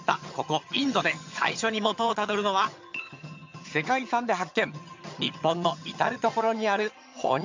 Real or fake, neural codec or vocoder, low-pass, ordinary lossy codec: fake; vocoder, 22.05 kHz, 80 mel bands, HiFi-GAN; 7.2 kHz; MP3, 64 kbps